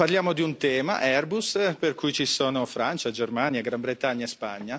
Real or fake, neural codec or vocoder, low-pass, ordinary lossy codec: real; none; none; none